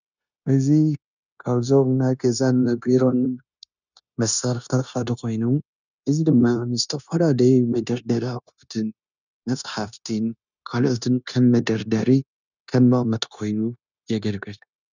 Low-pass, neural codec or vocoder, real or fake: 7.2 kHz; codec, 16 kHz, 0.9 kbps, LongCat-Audio-Codec; fake